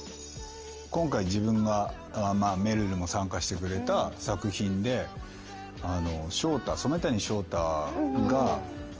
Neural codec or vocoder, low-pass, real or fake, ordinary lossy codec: none; 7.2 kHz; real; Opus, 24 kbps